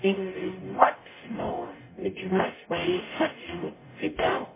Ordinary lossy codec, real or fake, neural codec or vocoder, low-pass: MP3, 16 kbps; fake; codec, 44.1 kHz, 0.9 kbps, DAC; 3.6 kHz